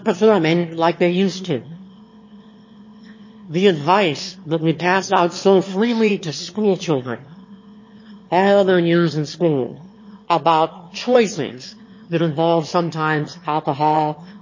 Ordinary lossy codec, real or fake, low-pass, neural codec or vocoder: MP3, 32 kbps; fake; 7.2 kHz; autoencoder, 22.05 kHz, a latent of 192 numbers a frame, VITS, trained on one speaker